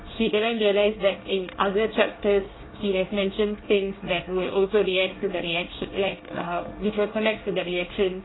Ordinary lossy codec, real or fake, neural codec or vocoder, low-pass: AAC, 16 kbps; fake; codec, 24 kHz, 1 kbps, SNAC; 7.2 kHz